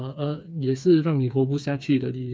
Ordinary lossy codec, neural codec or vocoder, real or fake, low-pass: none; codec, 16 kHz, 4 kbps, FreqCodec, smaller model; fake; none